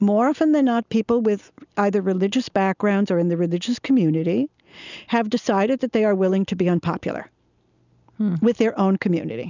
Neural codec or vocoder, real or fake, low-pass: none; real; 7.2 kHz